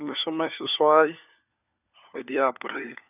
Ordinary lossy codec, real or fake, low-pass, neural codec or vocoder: none; fake; 3.6 kHz; codec, 16 kHz, 4 kbps, FunCodec, trained on LibriTTS, 50 frames a second